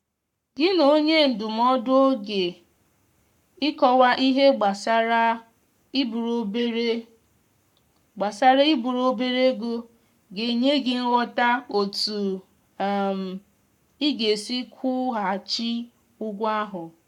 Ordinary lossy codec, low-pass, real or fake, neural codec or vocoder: none; 19.8 kHz; fake; codec, 44.1 kHz, 7.8 kbps, Pupu-Codec